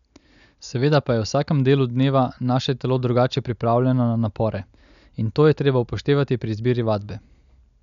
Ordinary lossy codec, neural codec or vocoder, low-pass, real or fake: none; none; 7.2 kHz; real